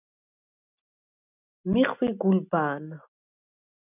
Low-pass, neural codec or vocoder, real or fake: 3.6 kHz; none; real